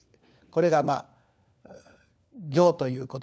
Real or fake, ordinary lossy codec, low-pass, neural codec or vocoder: fake; none; none; codec, 16 kHz, 4 kbps, FunCodec, trained on LibriTTS, 50 frames a second